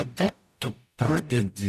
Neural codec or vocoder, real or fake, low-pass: codec, 44.1 kHz, 0.9 kbps, DAC; fake; 14.4 kHz